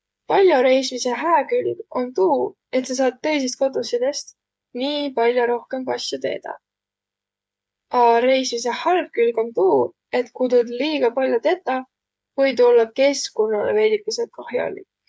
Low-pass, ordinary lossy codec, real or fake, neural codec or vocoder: none; none; fake; codec, 16 kHz, 8 kbps, FreqCodec, smaller model